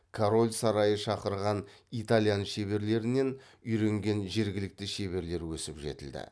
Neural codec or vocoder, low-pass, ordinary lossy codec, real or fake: none; none; none; real